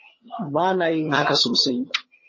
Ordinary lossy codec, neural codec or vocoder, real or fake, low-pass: MP3, 32 kbps; vocoder, 22.05 kHz, 80 mel bands, HiFi-GAN; fake; 7.2 kHz